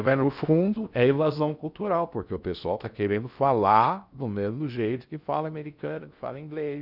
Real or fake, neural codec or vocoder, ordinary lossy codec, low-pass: fake; codec, 16 kHz in and 24 kHz out, 0.6 kbps, FocalCodec, streaming, 2048 codes; none; 5.4 kHz